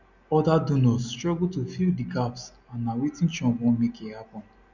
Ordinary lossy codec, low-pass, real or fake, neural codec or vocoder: none; 7.2 kHz; real; none